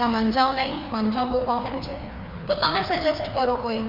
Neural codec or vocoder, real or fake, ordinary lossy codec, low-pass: codec, 16 kHz, 2 kbps, FreqCodec, larger model; fake; MP3, 48 kbps; 5.4 kHz